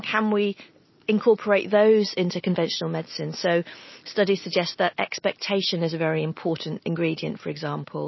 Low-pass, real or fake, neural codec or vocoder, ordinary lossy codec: 7.2 kHz; real; none; MP3, 24 kbps